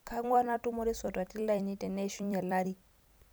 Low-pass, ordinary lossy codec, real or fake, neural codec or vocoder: none; none; fake; vocoder, 44.1 kHz, 128 mel bands every 256 samples, BigVGAN v2